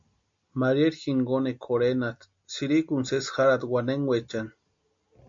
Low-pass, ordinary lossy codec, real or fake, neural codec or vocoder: 7.2 kHz; MP3, 48 kbps; real; none